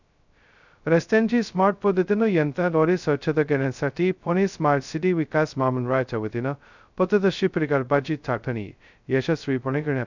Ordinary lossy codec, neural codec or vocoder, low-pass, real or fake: none; codec, 16 kHz, 0.2 kbps, FocalCodec; 7.2 kHz; fake